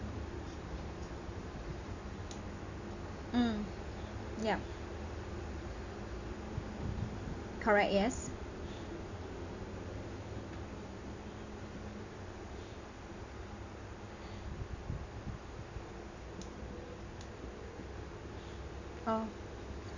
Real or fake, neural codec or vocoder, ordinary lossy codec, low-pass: real; none; none; 7.2 kHz